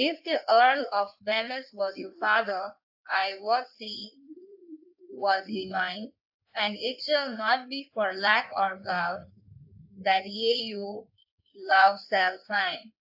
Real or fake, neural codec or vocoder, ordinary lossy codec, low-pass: fake; codec, 16 kHz in and 24 kHz out, 1.1 kbps, FireRedTTS-2 codec; AAC, 48 kbps; 5.4 kHz